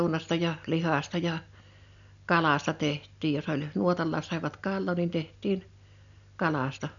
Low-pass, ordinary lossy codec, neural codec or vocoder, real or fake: 7.2 kHz; none; none; real